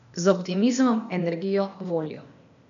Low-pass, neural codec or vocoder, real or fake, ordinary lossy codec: 7.2 kHz; codec, 16 kHz, 0.8 kbps, ZipCodec; fake; none